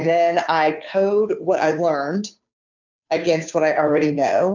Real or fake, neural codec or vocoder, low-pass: fake; codec, 16 kHz, 2 kbps, FunCodec, trained on Chinese and English, 25 frames a second; 7.2 kHz